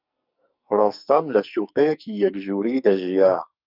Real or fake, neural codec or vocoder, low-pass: fake; codec, 44.1 kHz, 2.6 kbps, SNAC; 5.4 kHz